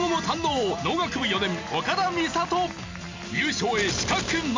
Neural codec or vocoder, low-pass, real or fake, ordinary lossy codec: none; 7.2 kHz; real; MP3, 48 kbps